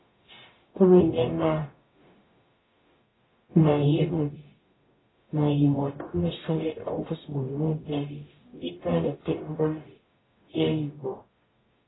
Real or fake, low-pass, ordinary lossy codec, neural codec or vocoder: fake; 7.2 kHz; AAC, 16 kbps; codec, 44.1 kHz, 0.9 kbps, DAC